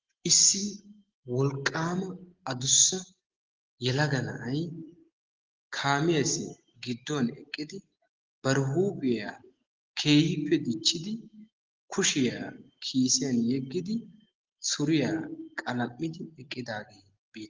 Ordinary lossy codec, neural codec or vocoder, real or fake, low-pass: Opus, 16 kbps; none; real; 7.2 kHz